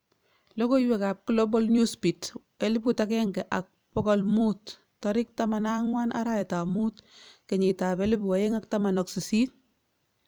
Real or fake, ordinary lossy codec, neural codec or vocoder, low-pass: fake; none; vocoder, 44.1 kHz, 128 mel bands every 256 samples, BigVGAN v2; none